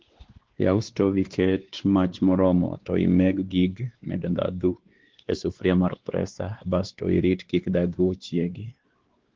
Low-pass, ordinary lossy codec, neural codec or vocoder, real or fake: 7.2 kHz; Opus, 16 kbps; codec, 16 kHz, 2 kbps, X-Codec, WavLM features, trained on Multilingual LibriSpeech; fake